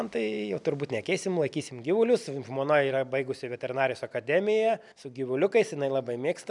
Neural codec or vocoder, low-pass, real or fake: none; 10.8 kHz; real